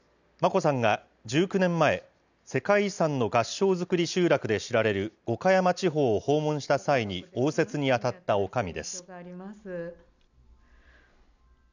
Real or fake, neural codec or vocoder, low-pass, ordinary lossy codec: real; none; 7.2 kHz; none